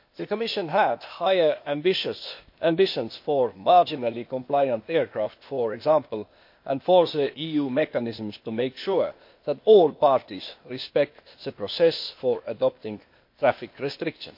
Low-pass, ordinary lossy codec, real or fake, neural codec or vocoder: 5.4 kHz; MP3, 32 kbps; fake; codec, 16 kHz, 0.8 kbps, ZipCodec